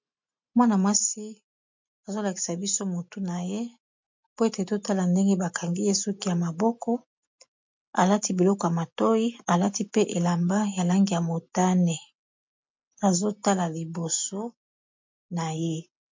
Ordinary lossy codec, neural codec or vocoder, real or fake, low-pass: MP3, 48 kbps; none; real; 7.2 kHz